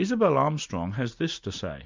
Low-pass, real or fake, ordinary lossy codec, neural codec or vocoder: 7.2 kHz; real; MP3, 64 kbps; none